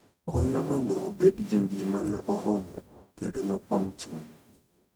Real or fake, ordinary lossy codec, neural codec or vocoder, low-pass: fake; none; codec, 44.1 kHz, 0.9 kbps, DAC; none